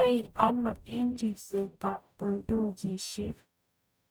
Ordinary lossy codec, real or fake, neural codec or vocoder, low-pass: none; fake; codec, 44.1 kHz, 0.9 kbps, DAC; none